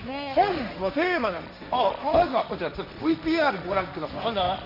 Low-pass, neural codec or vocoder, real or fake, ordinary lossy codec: 5.4 kHz; codec, 16 kHz, 1.1 kbps, Voila-Tokenizer; fake; none